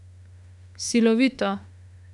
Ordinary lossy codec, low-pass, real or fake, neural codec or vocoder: none; 10.8 kHz; fake; autoencoder, 48 kHz, 32 numbers a frame, DAC-VAE, trained on Japanese speech